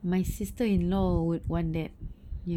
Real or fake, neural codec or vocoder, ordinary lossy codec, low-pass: real; none; none; 19.8 kHz